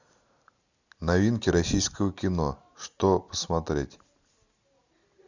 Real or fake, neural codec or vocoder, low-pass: real; none; 7.2 kHz